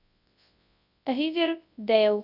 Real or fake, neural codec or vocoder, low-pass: fake; codec, 24 kHz, 0.9 kbps, WavTokenizer, large speech release; 5.4 kHz